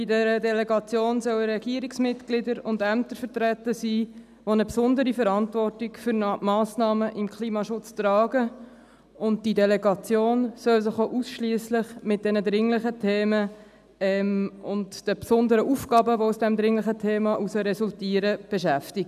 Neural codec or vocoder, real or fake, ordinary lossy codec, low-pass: none; real; none; 14.4 kHz